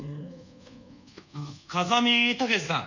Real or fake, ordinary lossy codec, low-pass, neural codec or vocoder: fake; none; 7.2 kHz; codec, 24 kHz, 1.2 kbps, DualCodec